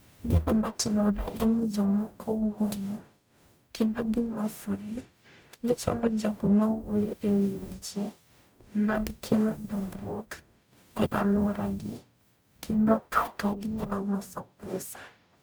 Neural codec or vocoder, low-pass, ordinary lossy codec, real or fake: codec, 44.1 kHz, 0.9 kbps, DAC; none; none; fake